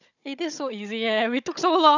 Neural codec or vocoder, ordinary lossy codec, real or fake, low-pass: codec, 16 kHz, 16 kbps, FunCodec, trained on Chinese and English, 50 frames a second; none; fake; 7.2 kHz